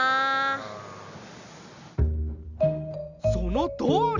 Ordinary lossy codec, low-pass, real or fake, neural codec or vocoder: Opus, 64 kbps; 7.2 kHz; real; none